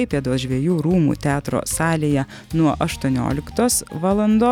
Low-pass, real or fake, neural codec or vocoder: 19.8 kHz; real; none